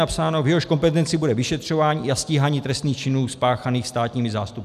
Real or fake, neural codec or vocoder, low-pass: real; none; 14.4 kHz